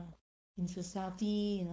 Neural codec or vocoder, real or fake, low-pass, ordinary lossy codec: codec, 16 kHz, 4.8 kbps, FACodec; fake; none; none